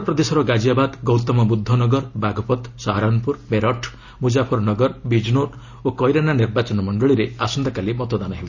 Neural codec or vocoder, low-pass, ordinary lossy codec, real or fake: none; 7.2 kHz; none; real